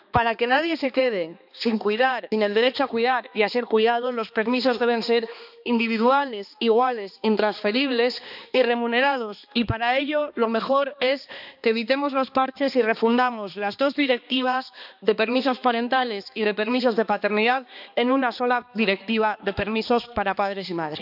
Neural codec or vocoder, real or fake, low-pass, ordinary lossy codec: codec, 16 kHz, 2 kbps, X-Codec, HuBERT features, trained on balanced general audio; fake; 5.4 kHz; none